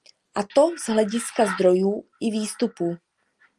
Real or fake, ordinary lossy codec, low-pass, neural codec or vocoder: real; Opus, 32 kbps; 10.8 kHz; none